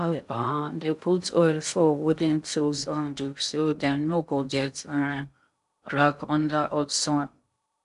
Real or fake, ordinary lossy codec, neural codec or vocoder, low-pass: fake; none; codec, 16 kHz in and 24 kHz out, 0.6 kbps, FocalCodec, streaming, 2048 codes; 10.8 kHz